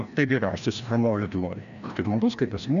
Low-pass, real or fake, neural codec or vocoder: 7.2 kHz; fake; codec, 16 kHz, 1 kbps, FreqCodec, larger model